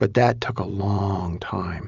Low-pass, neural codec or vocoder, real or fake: 7.2 kHz; none; real